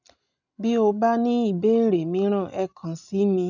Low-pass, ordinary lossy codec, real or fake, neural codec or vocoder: 7.2 kHz; none; real; none